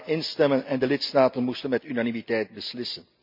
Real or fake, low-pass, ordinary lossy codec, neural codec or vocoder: real; 5.4 kHz; MP3, 48 kbps; none